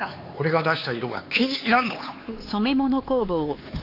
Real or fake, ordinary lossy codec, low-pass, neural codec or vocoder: fake; AAC, 32 kbps; 5.4 kHz; codec, 16 kHz, 4 kbps, X-Codec, HuBERT features, trained on LibriSpeech